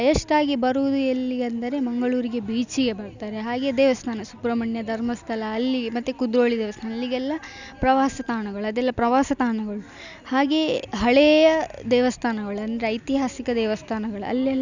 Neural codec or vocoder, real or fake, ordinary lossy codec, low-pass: none; real; none; 7.2 kHz